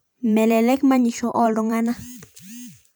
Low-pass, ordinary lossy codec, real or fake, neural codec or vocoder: none; none; fake; vocoder, 44.1 kHz, 128 mel bands every 512 samples, BigVGAN v2